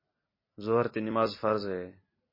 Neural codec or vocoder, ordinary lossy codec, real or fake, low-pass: none; MP3, 24 kbps; real; 5.4 kHz